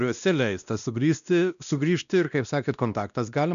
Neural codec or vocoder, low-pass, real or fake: codec, 16 kHz, 1 kbps, X-Codec, WavLM features, trained on Multilingual LibriSpeech; 7.2 kHz; fake